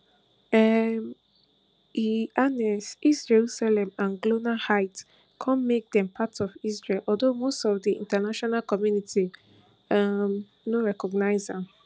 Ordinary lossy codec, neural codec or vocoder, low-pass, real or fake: none; none; none; real